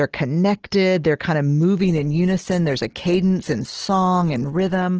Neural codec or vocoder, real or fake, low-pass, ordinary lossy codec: none; real; 7.2 kHz; Opus, 16 kbps